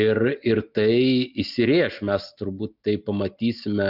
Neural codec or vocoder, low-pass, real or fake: none; 5.4 kHz; real